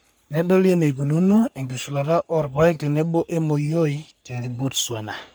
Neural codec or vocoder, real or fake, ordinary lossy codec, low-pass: codec, 44.1 kHz, 3.4 kbps, Pupu-Codec; fake; none; none